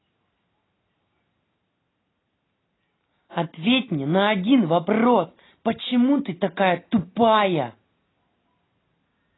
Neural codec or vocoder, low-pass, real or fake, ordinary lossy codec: none; 7.2 kHz; real; AAC, 16 kbps